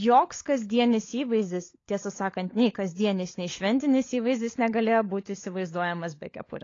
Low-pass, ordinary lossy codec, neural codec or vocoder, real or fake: 7.2 kHz; AAC, 32 kbps; codec, 16 kHz, 16 kbps, FunCodec, trained on LibriTTS, 50 frames a second; fake